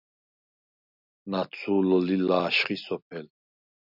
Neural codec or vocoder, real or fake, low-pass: none; real; 5.4 kHz